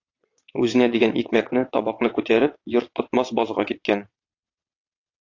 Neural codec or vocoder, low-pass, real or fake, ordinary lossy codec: vocoder, 22.05 kHz, 80 mel bands, WaveNeXt; 7.2 kHz; fake; AAC, 48 kbps